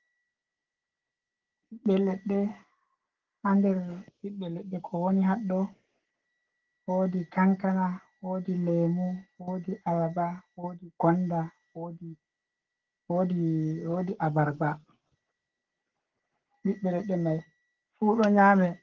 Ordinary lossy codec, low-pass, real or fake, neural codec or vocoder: Opus, 16 kbps; 7.2 kHz; fake; codec, 44.1 kHz, 7.8 kbps, Pupu-Codec